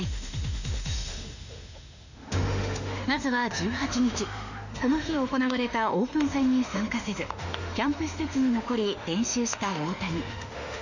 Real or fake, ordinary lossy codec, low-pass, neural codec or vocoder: fake; none; 7.2 kHz; autoencoder, 48 kHz, 32 numbers a frame, DAC-VAE, trained on Japanese speech